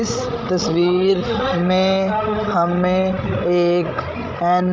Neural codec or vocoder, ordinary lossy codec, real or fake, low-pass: codec, 16 kHz, 16 kbps, FreqCodec, larger model; none; fake; none